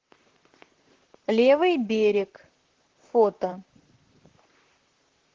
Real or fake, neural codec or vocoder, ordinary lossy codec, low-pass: fake; vocoder, 44.1 kHz, 128 mel bands, Pupu-Vocoder; Opus, 16 kbps; 7.2 kHz